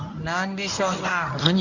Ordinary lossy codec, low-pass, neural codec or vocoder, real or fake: none; 7.2 kHz; codec, 24 kHz, 0.9 kbps, WavTokenizer, medium speech release version 1; fake